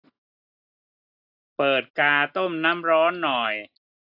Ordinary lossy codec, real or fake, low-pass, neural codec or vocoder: AAC, 48 kbps; real; 5.4 kHz; none